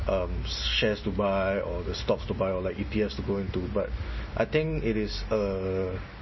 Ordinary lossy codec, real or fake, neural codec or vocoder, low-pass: MP3, 24 kbps; real; none; 7.2 kHz